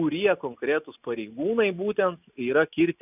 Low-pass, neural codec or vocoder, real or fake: 3.6 kHz; none; real